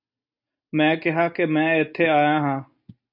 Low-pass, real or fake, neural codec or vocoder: 5.4 kHz; real; none